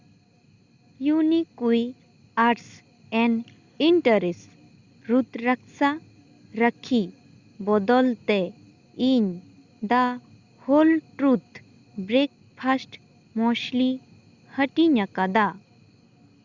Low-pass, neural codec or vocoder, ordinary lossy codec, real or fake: 7.2 kHz; none; Opus, 64 kbps; real